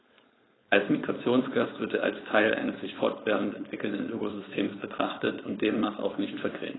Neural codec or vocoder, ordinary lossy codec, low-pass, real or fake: codec, 16 kHz, 4.8 kbps, FACodec; AAC, 16 kbps; 7.2 kHz; fake